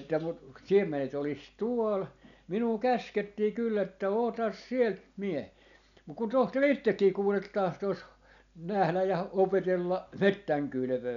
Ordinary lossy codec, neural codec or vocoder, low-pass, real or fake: none; none; 7.2 kHz; real